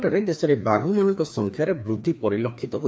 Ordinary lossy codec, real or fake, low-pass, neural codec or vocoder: none; fake; none; codec, 16 kHz, 2 kbps, FreqCodec, larger model